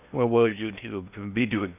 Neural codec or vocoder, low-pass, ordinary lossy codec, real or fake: codec, 16 kHz in and 24 kHz out, 0.6 kbps, FocalCodec, streaming, 2048 codes; 3.6 kHz; none; fake